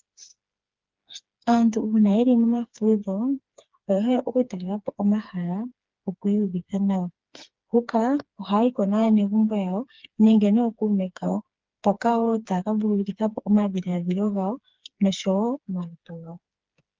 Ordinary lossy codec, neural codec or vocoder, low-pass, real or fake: Opus, 32 kbps; codec, 16 kHz, 4 kbps, FreqCodec, smaller model; 7.2 kHz; fake